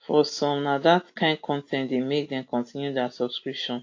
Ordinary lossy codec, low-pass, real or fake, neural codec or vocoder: AAC, 48 kbps; 7.2 kHz; real; none